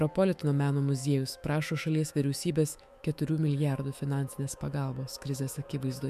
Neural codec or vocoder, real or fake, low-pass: autoencoder, 48 kHz, 128 numbers a frame, DAC-VAE, trained on Japanese speech; fake; 14.4 kHz